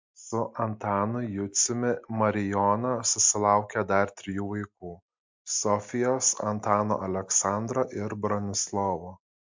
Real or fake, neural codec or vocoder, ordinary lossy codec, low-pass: real; none; MP3, 64 kbps; 7.2 kHz